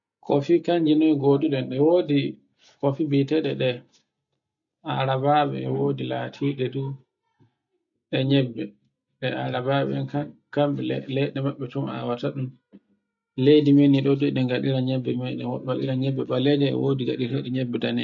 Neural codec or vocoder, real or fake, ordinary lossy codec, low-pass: none; real; none; 7.2 kHz